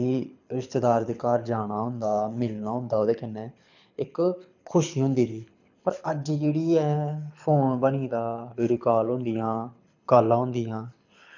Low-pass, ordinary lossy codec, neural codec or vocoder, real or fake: 7.2 kHz; none; codec, 24 kHz, 6 kbps, HILCodec; fake